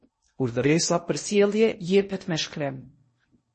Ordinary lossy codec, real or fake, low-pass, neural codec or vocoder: MP3, 32 kbps; fake; 10.8 kHz; codec, 16 kHz in and 24 kHz out, 0.6 kbps, FocalCodec, streaming, 2048 codes